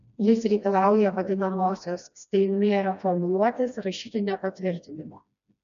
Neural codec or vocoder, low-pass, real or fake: codec, 16 kHz, 1 kbps, FreqCodec, smaller model; 7.2 kHz; fake